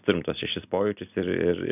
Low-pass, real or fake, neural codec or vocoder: 3.6 kHz; real; none